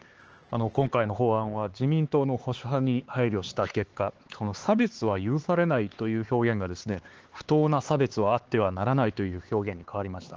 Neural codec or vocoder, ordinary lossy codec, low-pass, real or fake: codec, 16 kHz, 4 kbps, X-Codec, HuBERT features, trained on LibriSpeech; Opus, 16 kbps; 7.2 kHz; fake